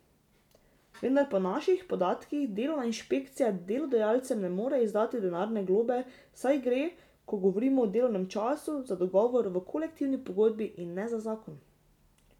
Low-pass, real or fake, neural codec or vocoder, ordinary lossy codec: 19.8 kHz; real; none; none